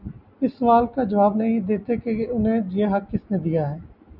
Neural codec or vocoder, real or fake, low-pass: none; real; 5.4 kHz